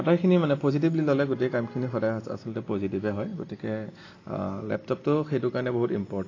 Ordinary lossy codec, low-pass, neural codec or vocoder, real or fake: AAC, 32 kbps; 7.2 kHz; none; real